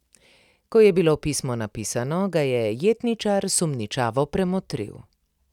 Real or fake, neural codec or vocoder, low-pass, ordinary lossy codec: real; none; 19.8 kHz; none